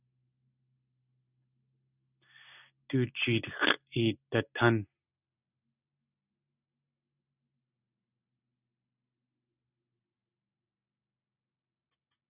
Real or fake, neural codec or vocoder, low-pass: real; none; 3.6 kHz